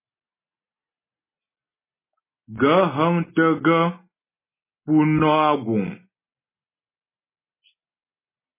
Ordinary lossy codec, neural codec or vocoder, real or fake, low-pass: MP3, 16 kbps; none; real; 3.6 kHz